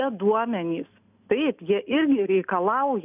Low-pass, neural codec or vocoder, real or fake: 3.6 kHz; none; real